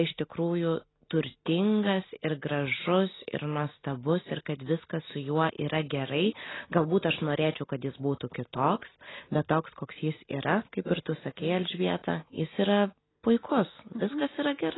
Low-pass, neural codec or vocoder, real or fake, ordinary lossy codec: 7.2 kHz; none; real; AAC, 16 kbps